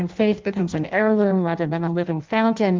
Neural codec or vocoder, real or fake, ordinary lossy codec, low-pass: codec, 16 kHz in and 24 kHz out, 0.6 kbps, FireRedTTS-2 codec; fake; Opus, 24 kbps; 7.2 kHz